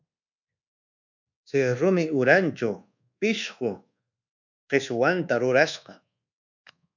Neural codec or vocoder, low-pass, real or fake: codec, 24 kHz, 1.2 kbps, DualCodec; 7.2 kHz; fake